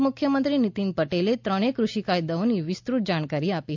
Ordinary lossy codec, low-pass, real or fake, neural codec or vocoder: MP3, 64 kbps; 7.2 kHz; real; none